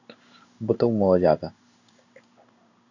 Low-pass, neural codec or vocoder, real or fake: 7.2 kHz; codec, 16 kHz in and 24 kHz out, 1 kbps, XY-Tokenizer; fake